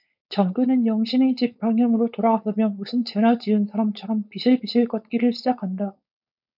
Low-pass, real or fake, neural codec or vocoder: 5.4 kHz; fake; codec, 16 kHz, 4.8 kbps, FACodec